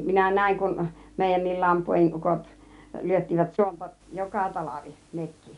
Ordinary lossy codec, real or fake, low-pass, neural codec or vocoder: none; real; 10.8 kHz; none